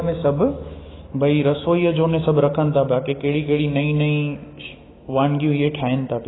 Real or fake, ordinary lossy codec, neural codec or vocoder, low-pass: fake; AAC, 16 kbps; autoencoder, 48 kHz, 128 numbers a frame, DAC-VAE, trained on Japanese speech; 7.2 kHz